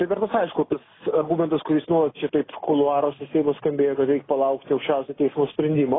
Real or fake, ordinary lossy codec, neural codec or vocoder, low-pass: real; AAC, 16 kbps; none; 7.2 kHz